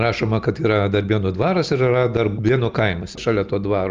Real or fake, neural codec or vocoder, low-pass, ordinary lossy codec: real; none; 7.2 kHz; Opus, 24 kbps